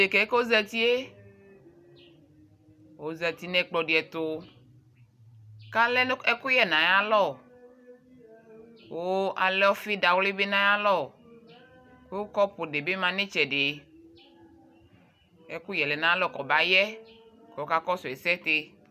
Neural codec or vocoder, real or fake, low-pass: none; real; 14.4 kHz